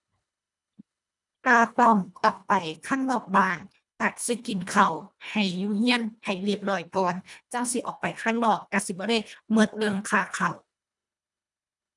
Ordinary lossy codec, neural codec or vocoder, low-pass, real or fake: none; codec, 24 kHz, 1.5 kbps, HILCodec; 10.8 kHz; fake